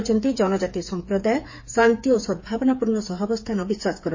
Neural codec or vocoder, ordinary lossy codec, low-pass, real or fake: codec, 16 kHz, 8 kbps, FreqCodec, smaller model; MP3, 32 kbps; 7.2 kHz; fake